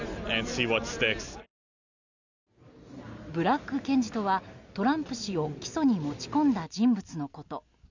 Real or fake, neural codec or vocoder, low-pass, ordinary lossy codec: real; none; 7.2 kHz; none